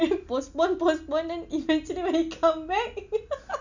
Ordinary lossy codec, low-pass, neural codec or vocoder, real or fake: none; 7.2 kHz; none; real